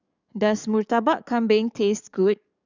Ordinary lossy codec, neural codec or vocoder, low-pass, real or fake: none; codec, 44.1 kHz, 7.8 kbps, DAC; 7.2 kHz; fake